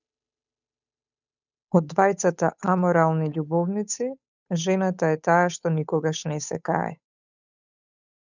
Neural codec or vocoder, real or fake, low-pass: codec, 16 kHz, 8 kbps, FunCodec, trained on Chinese and English, 25 frames a second; fake; 7.2 kHz